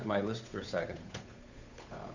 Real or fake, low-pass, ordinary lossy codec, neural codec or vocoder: fake; 7.2 kHz; Opus, 64 kbps; codec, 16 kHz, 8 kbps, FunCodec, trained on Chinese and English, 25 frames a second